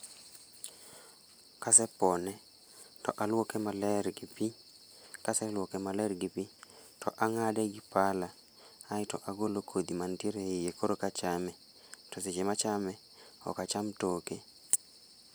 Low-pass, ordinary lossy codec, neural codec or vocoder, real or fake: none; none; none; real